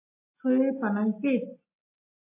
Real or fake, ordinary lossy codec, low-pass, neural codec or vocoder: real; MP3, 24 kbps; 3.6 kHz; none